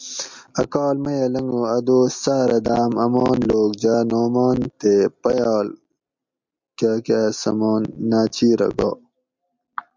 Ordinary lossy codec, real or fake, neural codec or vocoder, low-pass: MP3, 64 kbps; real; none; 7.2 kHz